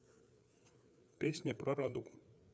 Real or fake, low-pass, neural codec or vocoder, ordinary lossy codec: fake; none; codec, 16 kHz, 4 kbps, FreqCodec, larger model; none